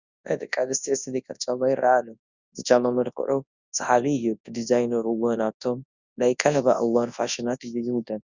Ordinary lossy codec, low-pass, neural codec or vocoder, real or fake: Opus, 64 kbps; 7.2 kHz; codec, 24 kHz, 0.9 kbps, WavTokenizer, large speech release; fake